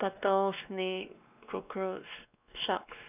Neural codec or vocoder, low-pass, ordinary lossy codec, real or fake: codec, 16 kHz, 2 kbps, X-Codec, WavLM features, trained on Multilingual LibriSpeech; 3.6 kHz; none; fake